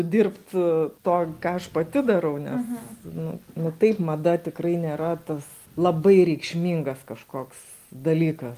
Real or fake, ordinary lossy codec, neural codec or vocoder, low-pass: real; Opus, 32 kbps; none; 14.4 kHz